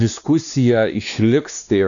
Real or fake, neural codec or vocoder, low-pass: fake; codec, 16 kHz, 2 kbps, X-Codec, WavLM features, trained on Multilingual LibriSpeech; 7.2 kHz